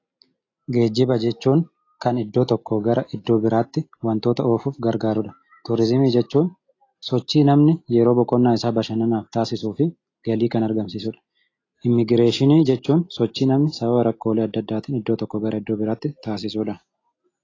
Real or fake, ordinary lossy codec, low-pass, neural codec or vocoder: real; AAC, 32 kbps; 7.2 kHz; none